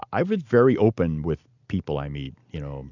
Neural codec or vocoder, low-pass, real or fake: none; 7.2 kHz; real